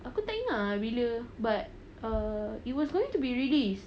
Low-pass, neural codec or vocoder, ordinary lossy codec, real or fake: none; none; none; real